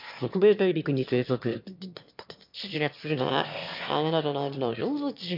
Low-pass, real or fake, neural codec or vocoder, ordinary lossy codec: 5.4 kHz; fake; autoencoder, 22.05 kHz, a latent of 192 numbers a frame, VITS, trained on one speaker; MP3, 48 kbps